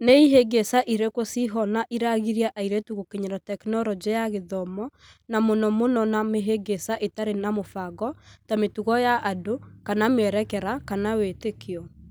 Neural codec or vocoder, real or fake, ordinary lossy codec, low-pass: none; real; none; none